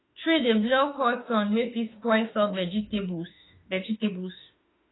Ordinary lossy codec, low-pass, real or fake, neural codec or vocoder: AAC, 16 kbps; 7.2 kHz; fake; autoencoder, 48 kHz, 32 numbers a frame, DAC-VAE, trained on Japanese speech